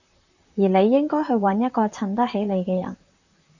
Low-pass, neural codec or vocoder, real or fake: 7.2 kHz; vocoder, 44.1 kHz, 128 mel bands, Pupu-Vocoder; fake